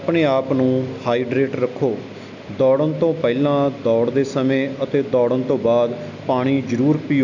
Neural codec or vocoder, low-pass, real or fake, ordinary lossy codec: none; 7.2 kHz; real; none